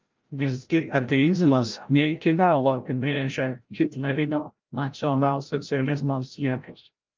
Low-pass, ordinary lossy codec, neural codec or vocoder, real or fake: 7.2 kHz; Opus, 24 kbps; codec, 16 kHz, 0.5 kbps, FreqCodec, larger model; fake